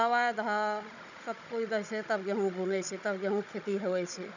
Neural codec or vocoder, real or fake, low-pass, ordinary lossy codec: codec, 16 kHz, 16 kbps, FunCodec, trained on Chinese and English, 50 frames a second; fake; 7.2 kHz; none